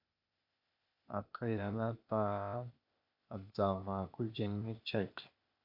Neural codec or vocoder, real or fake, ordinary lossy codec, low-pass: codec, 16 kHz, 0.8 kbps, ZipCodec; fake; AAC, 48 kbps; 5.4 kHz